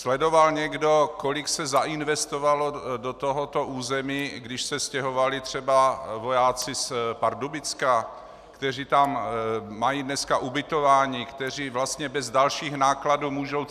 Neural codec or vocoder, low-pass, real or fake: none; 14.4 kHz; real